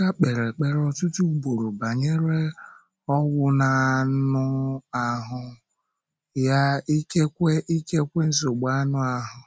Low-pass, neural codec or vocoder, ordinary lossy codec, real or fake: none; none; none; real